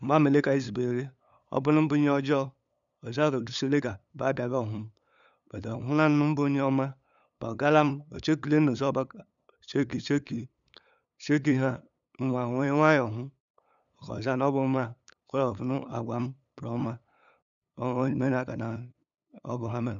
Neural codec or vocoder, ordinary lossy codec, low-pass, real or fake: codec, 16 kHz, 8 kbps, FunCodec, trained on LibriTTS, 25 frames a second; none; 7.2 kHz; fake